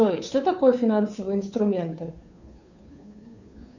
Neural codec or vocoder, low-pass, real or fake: codec, 16 kHz, 2 kbps, FunCodec, trained on Chinese and English, 25 frames a second; 7.2 kHz; fake